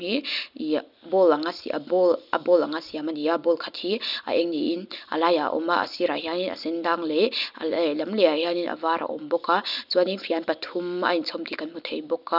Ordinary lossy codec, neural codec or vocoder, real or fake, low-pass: none; none; real; 5.4 kHz